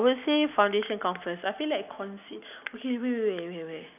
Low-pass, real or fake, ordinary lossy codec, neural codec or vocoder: 3.6 kHz; real; none; none